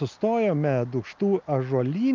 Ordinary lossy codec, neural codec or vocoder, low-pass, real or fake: Opus, 24 kbps; none; 7.2 kHz; real